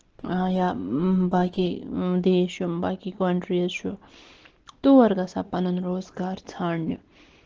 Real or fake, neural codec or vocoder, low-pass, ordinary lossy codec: real; none; 7.2 kHz; Opus, 16 kbps